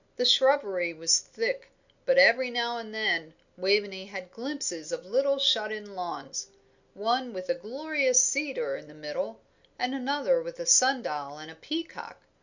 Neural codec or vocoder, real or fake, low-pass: none; real; 7.2 kHz